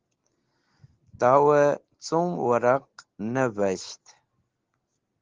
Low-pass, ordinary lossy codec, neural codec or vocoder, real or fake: 7.2 kHz; Opus, 16 kbps; none; real